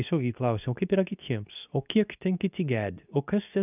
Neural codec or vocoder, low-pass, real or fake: codec, 24 kHz, 0.9 kbps, WavTokenizer, medium speech release version 2; 3.6 kHz; fake